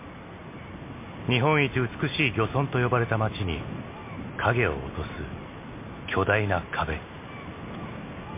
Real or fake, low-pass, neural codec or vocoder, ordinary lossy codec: real; 3.6 kHz; none; MP3, 24 kbps